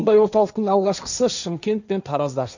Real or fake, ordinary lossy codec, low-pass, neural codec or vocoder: fake; none; 7.2 kHz; codec, 16 kHz, 1.1 kbps, Voila-Tokenizer